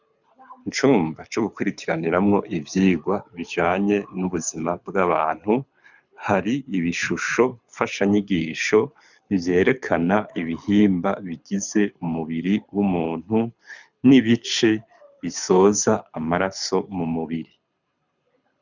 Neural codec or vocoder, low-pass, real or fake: codec, 24 kHz, 6 kbps, HILCodec; 7.2 kHz; fake